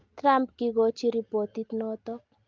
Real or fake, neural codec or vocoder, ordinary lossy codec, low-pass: real; none; Opus, 32 kbps; 7.2 kHz